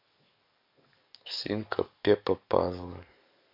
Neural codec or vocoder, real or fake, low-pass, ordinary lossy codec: codec, 44.1 kHz, 7.8 kbps, DAC; fake; 5.4 kHz; MP3, 48 kbps